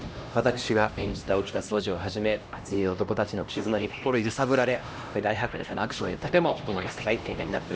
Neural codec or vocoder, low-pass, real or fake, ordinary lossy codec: codec, 16 kHz, 1 kbps, X-Codec, HuBERT features, trained on LibriSpeech; none; fake; none